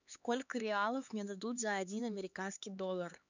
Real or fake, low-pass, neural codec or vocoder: fake; 7.2 kHz; codec, 16 kHz, 4 kbps, X-Codec, HuBERT features, trained on LibriSpeech